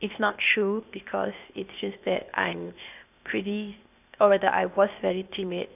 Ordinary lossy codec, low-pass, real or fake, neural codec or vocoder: none; 3.6 kHz; fake; codec, 16 kHz, 0.8 kbps, ZipCodec